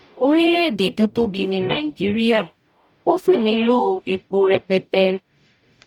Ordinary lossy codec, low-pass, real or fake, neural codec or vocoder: none; 19.8 kHz; fake; codec, 44.1 kHz, 0.9 kbps, DAC